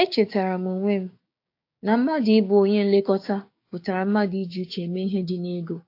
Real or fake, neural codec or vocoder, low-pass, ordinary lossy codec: fake; codec, 16 kHz, 6 kbps, DAC; 5.4 kHz; AAC, 32 kbps